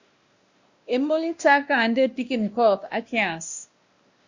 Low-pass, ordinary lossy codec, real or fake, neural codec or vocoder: 7.2 kHz; Opus, 64 kbps; fake; codec, 16 kHz, 1 kbps, X-Codec, WavLM features, trained on Multilingual LibriSpeech